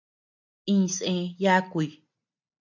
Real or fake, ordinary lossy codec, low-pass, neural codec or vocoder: real; MP3, 64 kbps; 7.2 kHz; none